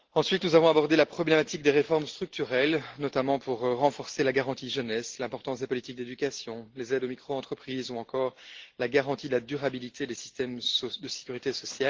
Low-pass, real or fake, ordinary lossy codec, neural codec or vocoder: 7.2 kHz; real; Opus, 16 kbps; none